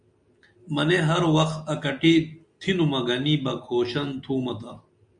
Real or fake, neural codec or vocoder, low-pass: real; none; 9.9 kHz